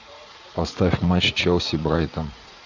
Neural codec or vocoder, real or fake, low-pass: vocoder, 44.1 kHz, 80 mel bands, Vocos; fake; 7.2 kHz